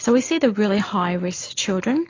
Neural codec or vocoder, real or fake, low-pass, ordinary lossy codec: none; real; 7.2 kHz; AAC, 32 kbps